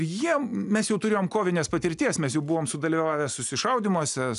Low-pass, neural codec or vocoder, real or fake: 10.8 kHz; none; real